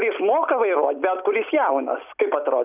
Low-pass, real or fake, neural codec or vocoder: 3.6 kHz; real; none